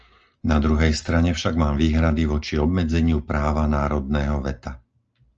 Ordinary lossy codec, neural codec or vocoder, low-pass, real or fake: Opus, 24 kbps; none; 7.2 kHz; real